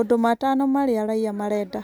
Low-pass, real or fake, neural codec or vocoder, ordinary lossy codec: none; real; none; none